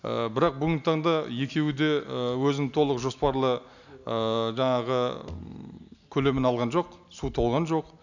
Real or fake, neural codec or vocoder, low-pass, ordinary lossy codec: real; none; 7.2 kHz; none